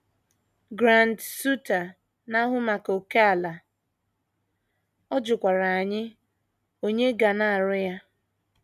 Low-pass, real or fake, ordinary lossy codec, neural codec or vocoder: 14.4 kHz; real; none; none